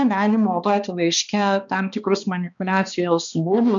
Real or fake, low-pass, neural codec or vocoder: fake; 7.2 kHz; codec, 16 kHz, 2 kbps, X-Codec, HuBERT features, trained on balanced general audio